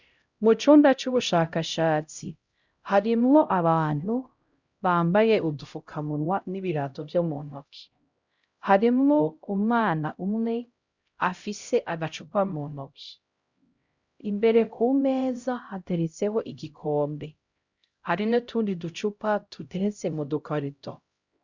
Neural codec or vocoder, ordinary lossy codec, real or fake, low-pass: codec, 16 kHz, 0.5 kbps, X-Codec, HuBERT features, trained on LibriSpeech; Opus, 64 kbps; fake; 7.2 kHz